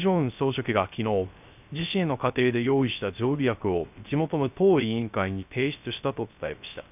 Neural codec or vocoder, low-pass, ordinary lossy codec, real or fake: codec, 16 kHz, 0.3 kbps, FocalCodec; 3.6 kHz; none; fake